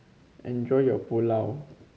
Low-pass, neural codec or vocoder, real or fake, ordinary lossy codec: none; none; real; none